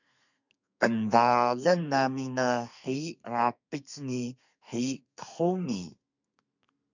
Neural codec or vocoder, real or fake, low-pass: codec, 32 kHz, 1.9 kbps, SNAC; fake; 7.2 kHz